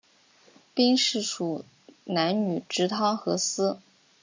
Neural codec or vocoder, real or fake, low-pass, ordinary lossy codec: none; real; 7.2 kHz; MP3, 64 kbps